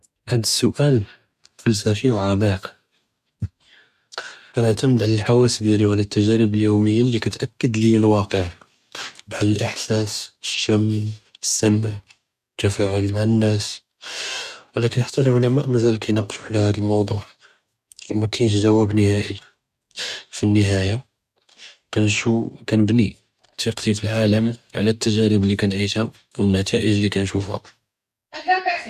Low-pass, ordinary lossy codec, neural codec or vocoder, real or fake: 14.4 kHz; none; codec, 44.1 kHz, 2.6 kbps, DAC; fake